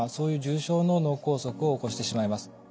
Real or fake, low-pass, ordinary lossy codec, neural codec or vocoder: real; none; none; none